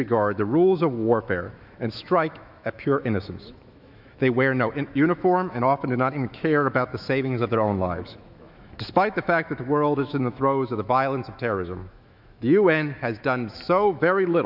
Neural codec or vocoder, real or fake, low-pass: autoencoder, 48 kHz, 128 numbers a frame, DAC-VAE, trained on Japanese speech; fake; 5.4 kHz